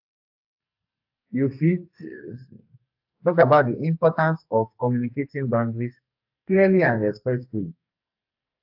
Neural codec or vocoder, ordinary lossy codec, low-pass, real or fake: codec, 44.1 kHz, 2.6 kbps, SNAC; AAC, 48 kbps; 5.4 kHz; fake